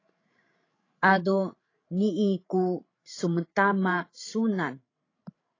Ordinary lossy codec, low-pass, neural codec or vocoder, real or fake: AAC, 32 kbps; 7.2 kHz; codec, 16 kHz, 16 kbps, FreqCodec, larger model; fake